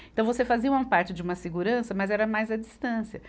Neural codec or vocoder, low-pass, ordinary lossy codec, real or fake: none; none; none; real